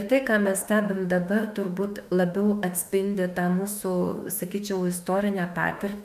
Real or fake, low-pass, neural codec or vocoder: fake; 14.4 kHz; autoencoder, 48 kHz, 32 numbers a frame, DAC-VAE, trained on Japanese speech